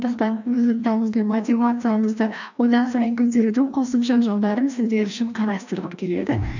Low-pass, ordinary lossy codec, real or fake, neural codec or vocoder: 7.2 kHz; none; fake; codec, 16 kHz, 1 kbps, FreqCodec, larger model